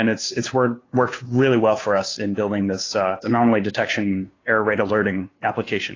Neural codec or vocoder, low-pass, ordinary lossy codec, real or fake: codec, 16 kHz, 2 kbps, FunCodec, trained on Chinese and English, 25 frames a second; 7.2 kHz; AAC, 32 kbps; fake